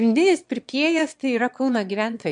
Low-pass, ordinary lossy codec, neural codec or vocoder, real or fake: 9.9 kHz; MP3, 64 kbps; autoencoder, 22.05 kHz, a latent of 192 numbers a frame, VITS, trained on one speaker; fake